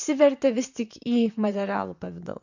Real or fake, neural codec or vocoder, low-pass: fake; vocoder, 44.1 kHz, 128 mel bands, Pupu-Vocoder; 7.2 kHz